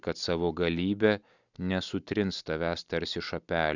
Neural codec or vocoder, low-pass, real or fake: none; 7.2 kHz; real